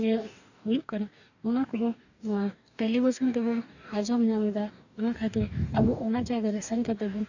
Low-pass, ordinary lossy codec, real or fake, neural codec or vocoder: 7.2 kHz; none; fake; codec, 44.1 kHz, 2.6 kbps, DAC